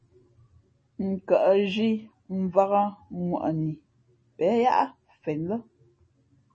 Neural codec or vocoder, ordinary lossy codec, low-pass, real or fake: none; MP3, 32 kbps; 9.9 kHz; real